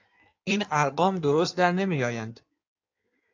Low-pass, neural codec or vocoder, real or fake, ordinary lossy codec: 7.2 kHz; codec, 16 kHz in and 24 kHz out, 1.1 kbps, FireRedTTS-2 codec; fake; AAC, 48 kbps